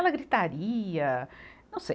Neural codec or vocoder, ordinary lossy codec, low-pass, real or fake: none; none; none; real